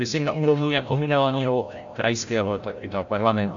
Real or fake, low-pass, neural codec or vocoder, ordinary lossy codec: fake; 7.2 kHz; codec, 16 kHz, 0.5 kbps, FreqCodec, larger model; AAC, 64 kbps